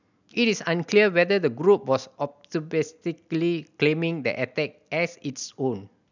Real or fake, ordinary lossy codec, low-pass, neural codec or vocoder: real; none; 7.2 kHz; none